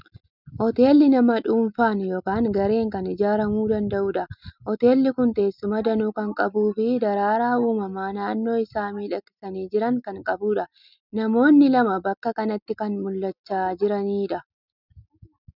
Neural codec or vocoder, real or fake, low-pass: none; real; 5.4 kHz